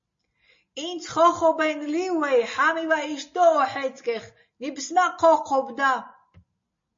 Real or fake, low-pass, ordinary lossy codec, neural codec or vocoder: real; 7.2 kHz; MP3, 32 kbps; none